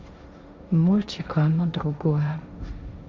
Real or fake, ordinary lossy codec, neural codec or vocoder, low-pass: fake; none; codec, 16 kHz, 1.1 kbps, Voila-Tokenizer; none